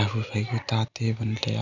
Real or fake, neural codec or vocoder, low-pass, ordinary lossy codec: real; none; 7.2 kHz; none